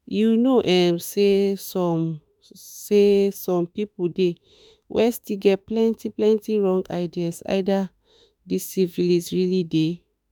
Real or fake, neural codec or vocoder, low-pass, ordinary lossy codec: fake; autoencoder, 48 kHz, 32 numbers a frame, DAC-VAE, trained on Japanese speech; 19.8 kHz; none